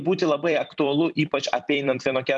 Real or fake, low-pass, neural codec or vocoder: fake; 10.8 kHz; vocoder, 44.1 kHz, 128 mel bands every 256 samples, BigVGAN v2